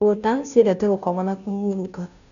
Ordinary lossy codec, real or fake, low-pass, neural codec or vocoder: none; fake; 7.2 kHz; codec, 16 kHz, 0.5 kbps, FunCodec, trained on Chinese and English, 25 frames a second